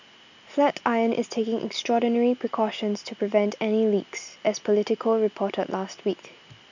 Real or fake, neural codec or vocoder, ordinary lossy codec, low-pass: real; none; none; 7.2 kHz